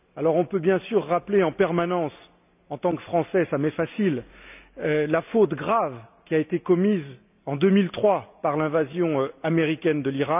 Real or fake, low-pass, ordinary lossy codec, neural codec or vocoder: real; 3.6 kHz; none; none